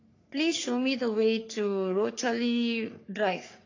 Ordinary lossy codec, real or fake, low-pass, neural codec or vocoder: AAC, 32 kbps; fake; 7.2 kHz; codec, 44.1 kHz, 3.4 kbps, Pupu-Codec